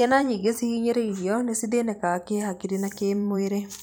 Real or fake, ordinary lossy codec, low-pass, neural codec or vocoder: fake; none; none; vocoder, 44.1 kHz, 128 mel bands every 512 samples, BigVGAN v2